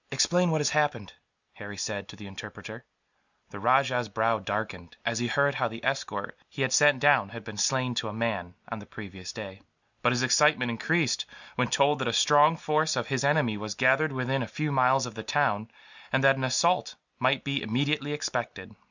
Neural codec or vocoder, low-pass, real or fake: none; 7.2 kHz; real